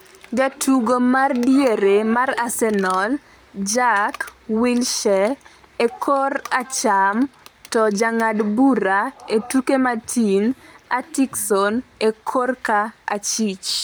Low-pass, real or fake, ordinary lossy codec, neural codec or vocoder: none; fake; none; codec, 44.1 kHz, 7.8 kbps, Pupu-Codec